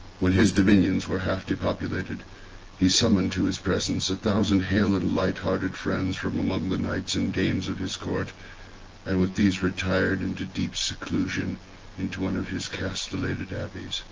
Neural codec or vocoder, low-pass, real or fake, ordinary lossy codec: vocoder, 24 kHz, 100 mel bands, Vocos; 7.2 kHz; fake; Opus, 16 kbps